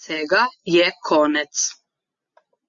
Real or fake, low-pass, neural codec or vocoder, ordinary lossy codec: real; 7.2 kHz; none; Opus, 64 kbps